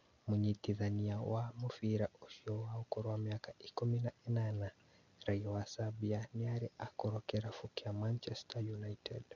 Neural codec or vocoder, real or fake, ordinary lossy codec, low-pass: none; real; none; 7.2 kHz